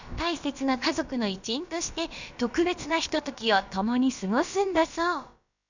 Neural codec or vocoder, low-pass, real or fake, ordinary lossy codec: codec, 16 kHz, about 1 kbps, DyCAST, with the encoder's durations; 7.2 kHz; fake; none